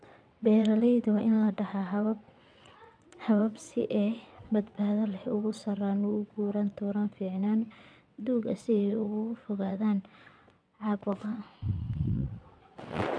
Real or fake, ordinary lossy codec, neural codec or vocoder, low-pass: fake; none; vocoder, 22.05 kHz, 80 mel bands, WaveNeXt; 9.9 kHz